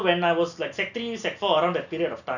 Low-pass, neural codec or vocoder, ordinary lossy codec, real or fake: 7.2 kHz; none; none; real